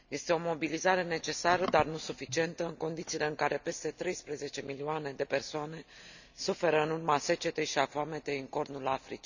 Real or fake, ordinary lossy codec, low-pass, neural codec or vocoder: real; none; 7.2 kHz; none